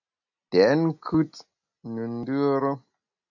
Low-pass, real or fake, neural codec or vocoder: 7.2 kHz; real; none